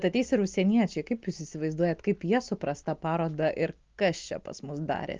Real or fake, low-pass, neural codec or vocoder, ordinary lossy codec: real; 7.2 kHz; none; Opus, 32 kbps